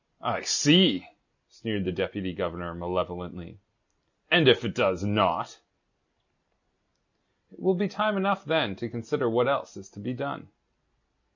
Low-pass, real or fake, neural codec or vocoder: 7.2 kHz; real; none